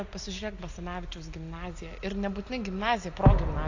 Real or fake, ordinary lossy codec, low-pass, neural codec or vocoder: real; AAC, 48 kbps; 7.2 kHz; none